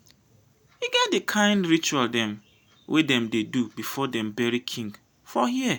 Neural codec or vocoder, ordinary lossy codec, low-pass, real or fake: none; none; none; real